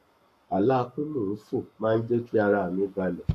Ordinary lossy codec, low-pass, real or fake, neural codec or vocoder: none; 14.4 kHz; fake; codec, 44.1 kHz, 7.8 kbps, Pupu-Codec